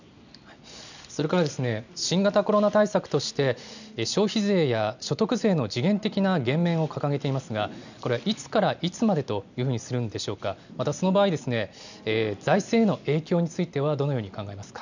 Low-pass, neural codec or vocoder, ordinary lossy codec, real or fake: 7.2 kHz; none; none; real